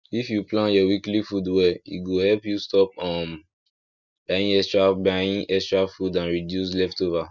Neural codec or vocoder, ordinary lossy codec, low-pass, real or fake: none; none; 7.2 kHz; real